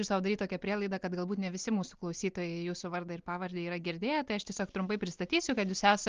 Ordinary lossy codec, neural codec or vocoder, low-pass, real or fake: Opus, 32 kbps; none; 7.2 kHz; real